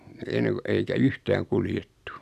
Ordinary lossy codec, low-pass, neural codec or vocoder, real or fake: none; 14.4 kHz; none; real